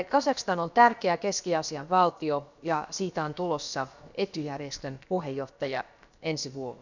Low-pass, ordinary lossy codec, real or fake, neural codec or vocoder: 7.2 kHz; none; fake; codec, 16 kHz, about 1 kbps, DyCAST, with the encoder's durations